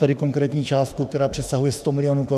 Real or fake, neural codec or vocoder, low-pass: fake; autoencoder, 48 kHz, 32 numbers a frame, DAC-VAE, trained on Japanese speech; 14.4 kHz